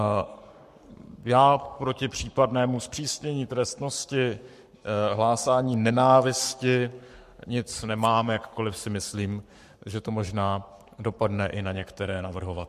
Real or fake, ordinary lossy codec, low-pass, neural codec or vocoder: fake; MP3, 64 kbps; 14.4 kHz; codec, 44.1 kHz, 7.8 kbps, Pupu-Codec